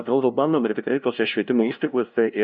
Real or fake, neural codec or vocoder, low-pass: fake; codec, 16 kHz, 0.5 kbps, FunCodec, trained on LibriTTS, 25 frames a second; 7.2 kHz